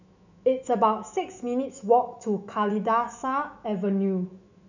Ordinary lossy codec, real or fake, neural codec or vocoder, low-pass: none; fake; autoencoder, 48 kHz, 128 numbers a frame, DAC-VAE, trained on Japanese speech; 7.2 kHz